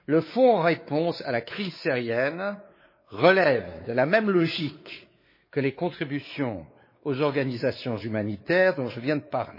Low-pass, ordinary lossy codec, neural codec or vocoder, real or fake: 5.4 kHz; MP3, 24 kbps; codec, 16 kHz, 4 kbps, X-Codec, WavLM features, trained on Multilingual LibriSpeech; fake